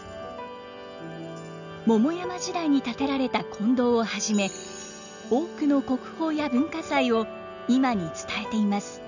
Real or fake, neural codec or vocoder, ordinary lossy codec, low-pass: real; none; none; 7.2 kHz